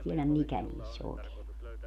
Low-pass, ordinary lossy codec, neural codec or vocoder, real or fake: 14.4 kHz; none; none; real